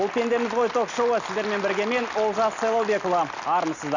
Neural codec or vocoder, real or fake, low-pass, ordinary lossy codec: none; real; 7.2 kHz; none